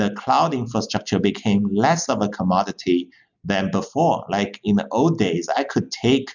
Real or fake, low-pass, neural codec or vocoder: real; 7.2 kHz; none